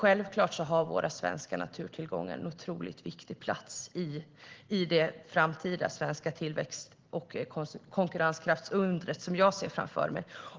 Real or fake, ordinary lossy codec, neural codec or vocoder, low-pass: real; Opus, 16 kbps; none; 7.2 kHz